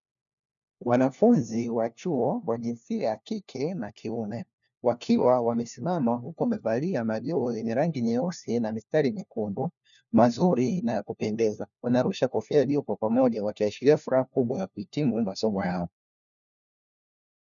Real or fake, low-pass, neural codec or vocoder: fake; 7.2 kHz; codec, 16 kHz, 1 kbps, FunCodec, trained on LibriTTS, 50 frames a second